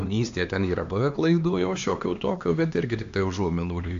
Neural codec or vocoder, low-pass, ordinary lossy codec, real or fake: codec, 16 kHz, 2 kbps, X-Codec, HuBERT features, trained on LibriSpeech; 7.2 kHz; AAC, 64 kbps; fake